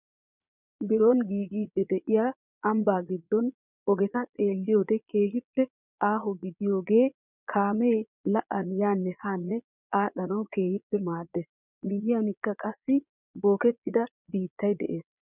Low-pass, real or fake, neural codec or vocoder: 3.6 kHz; fake; vocoder, 22.05 kHz, 80 mel bands, Vocos